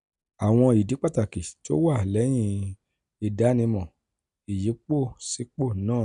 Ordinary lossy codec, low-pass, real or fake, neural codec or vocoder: none; 10.8 kHz; real; none